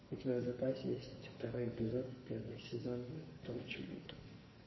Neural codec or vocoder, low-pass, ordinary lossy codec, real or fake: codec, 44.1 kHz, 2.6 kbps, SNAC; 7.2 kHz; MP3, 24 kbps; fake